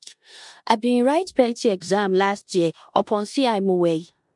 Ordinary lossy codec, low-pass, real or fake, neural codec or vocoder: MP3, 64 kbps; 10.8 kHz; fake; codec, 16 kHz in and 24 kHz out, 0.9 kbps, LongCat-Audio-Codec, four codebook decoder